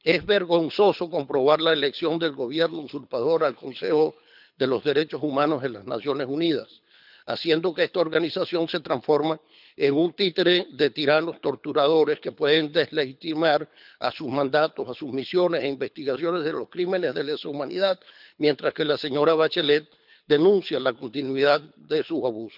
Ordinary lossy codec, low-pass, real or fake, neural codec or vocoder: none; 5.4 kHz; fake; codec, 24 kHz, 6 kbps, HILCodec